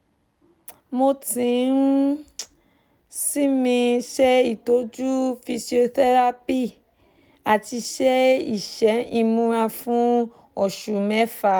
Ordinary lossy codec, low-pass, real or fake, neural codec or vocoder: none; none; real; none